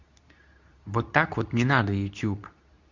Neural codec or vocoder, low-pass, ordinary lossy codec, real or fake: codec, 24 kHz, 0.9 kbps, WavTokenizer, medium speech release version 2; 7.2 kHz; Opus, 64 kbps; fake